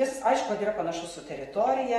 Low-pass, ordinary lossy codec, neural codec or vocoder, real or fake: 19.8 kHz; AAC, 32 kbps; none; real